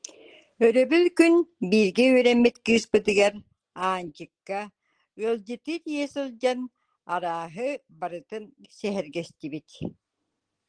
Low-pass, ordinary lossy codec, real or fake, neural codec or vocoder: 9.9 kHz; Opus, 16 kbps; real; none